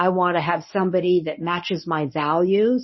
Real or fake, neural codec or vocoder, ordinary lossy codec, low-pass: fake; codec, 24 kHz, 0.9 kbps, WavTokenizer, medium speech release version 1; MP3, 24 kbps; 7.2 kHz